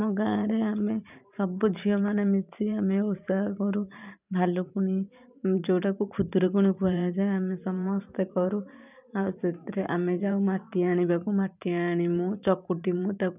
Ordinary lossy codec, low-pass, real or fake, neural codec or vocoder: none; 3.6 kHz; fake; vocoder, 44.1 kHz, 128 mel bands every 512 samples, BigVGAN v2